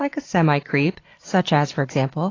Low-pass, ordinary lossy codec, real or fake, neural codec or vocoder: 7.2 kHz; AAC, 32 kbps; real; none